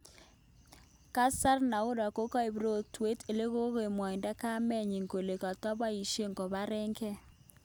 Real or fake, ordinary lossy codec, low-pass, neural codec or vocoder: real; none; none; none